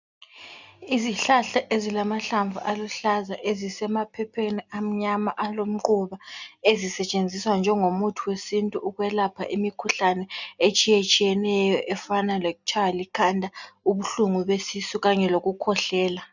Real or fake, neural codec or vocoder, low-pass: real; none; 7.2 kHz